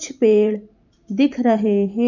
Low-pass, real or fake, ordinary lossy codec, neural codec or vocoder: 7.2 kHz; fake; none; vocoder, 44.1 kHz, 128 mel bands every 512 samples, BigVGAN v2